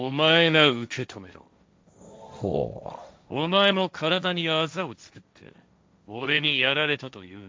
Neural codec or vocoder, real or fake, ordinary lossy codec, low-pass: codec, 16 kHz, 1.1 kbps, Voila-Tokenizer; fake; none; none